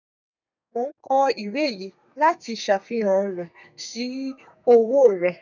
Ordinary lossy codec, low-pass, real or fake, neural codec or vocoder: none; 7.2 kHz; fake; codec, 32 kHz, 1.9 kbps, SNAC